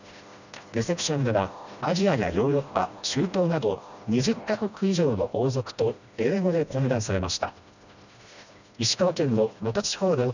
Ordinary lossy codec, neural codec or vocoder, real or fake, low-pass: none; codec, 16 kHz, 1 kbps, FreqCodec, smaller model; fake; 7.2 kHz